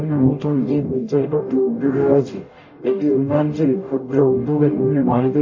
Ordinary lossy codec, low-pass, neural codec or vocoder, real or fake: MP3, 32 kbps; 7.2 kHz; codec, 44.1 kHz, 0.9 kbps, DAC; fake